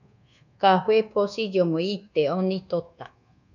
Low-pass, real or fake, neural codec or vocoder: 7.2 kHz; fake; codec, 24 kHz, 1.2 kbps, DualCodec